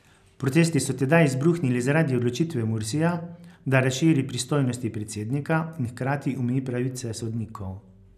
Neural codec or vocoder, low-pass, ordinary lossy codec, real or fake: none; 14.4 kHz; none; real